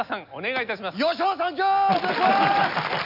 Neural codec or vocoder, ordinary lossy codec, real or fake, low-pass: none; none; real; 5.4 kHz